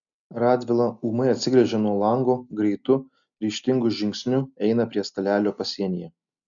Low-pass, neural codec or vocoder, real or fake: 7.2 kHz; none; real